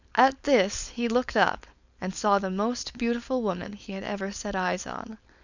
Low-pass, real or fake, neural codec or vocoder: 7.2 kHz; fake; codec, 16 kHz, 4.8 kbps, FACodec